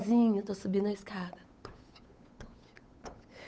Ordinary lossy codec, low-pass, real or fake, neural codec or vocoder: none; none; fake; codec, 16 kHz, 8 kbps, FunCodec, trained on Chinese and English, 25 frames a second